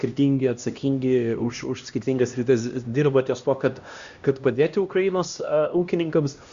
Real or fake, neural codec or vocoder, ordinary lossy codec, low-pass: fake; codec, 16 kHz, 1 kbps, X-Codec, HuBERT features, trained on LibriSpeech; Opus, 64 kbps; 7.2 kHz